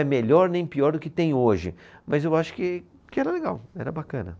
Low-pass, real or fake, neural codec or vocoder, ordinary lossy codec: none; real; none; none